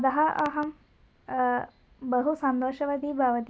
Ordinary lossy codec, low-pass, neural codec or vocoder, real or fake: none; none; none; real